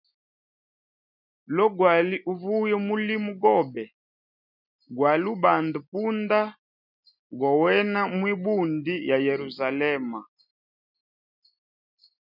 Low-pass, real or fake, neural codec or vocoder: 5.4 kHz; real; none